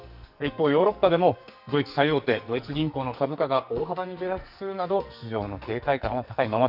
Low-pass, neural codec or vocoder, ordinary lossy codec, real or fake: 5.4 kHz; codec, 44.1 kHz, 2.6 kbps, SNAC; none; fake